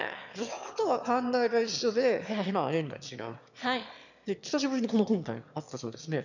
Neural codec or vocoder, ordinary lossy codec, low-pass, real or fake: autoencoder, 22.05 kHz, a latent of 192 numbers a frame, VITS, trained on one speaker; none; 7.2 kHz; fake